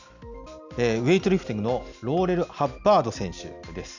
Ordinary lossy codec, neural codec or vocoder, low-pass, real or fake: none; none; 7.2 kHz; real